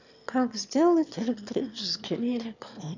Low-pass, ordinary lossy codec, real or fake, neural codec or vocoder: 7.2 kHz; none; fake; autoencoder, 22.05 kHz, a latent of 192 numbers a frame, VITS, trained on one speaker